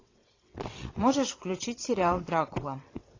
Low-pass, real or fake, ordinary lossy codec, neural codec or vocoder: 7.2 kHz; fake; AAC, 32 kbps; vocoder, 22.05 kHz, 80 mel bands, WaveNeXt